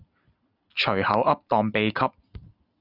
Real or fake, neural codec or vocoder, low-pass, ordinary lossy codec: real; none; 5.4 kHz; Opus, 64 kbps